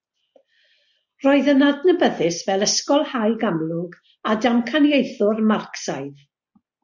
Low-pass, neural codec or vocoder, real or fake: 7.2 kHz; none; real